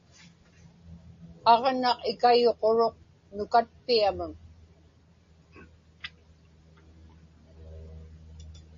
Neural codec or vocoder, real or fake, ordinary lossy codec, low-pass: none; real; MP3, 32 kbps; 7.2 kHz